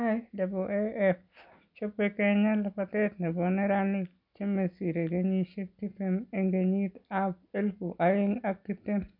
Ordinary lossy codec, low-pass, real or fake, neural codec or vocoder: none; 5.4 kHz; real; none